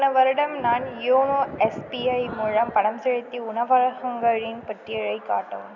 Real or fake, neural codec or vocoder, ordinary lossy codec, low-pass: real; none; none; 7.2 kHz